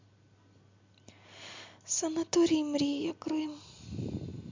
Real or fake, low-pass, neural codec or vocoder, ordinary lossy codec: real; 7.2 kHz; none; MP3, 64 kbps